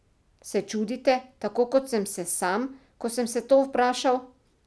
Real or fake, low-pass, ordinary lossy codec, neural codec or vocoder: real; none; none; none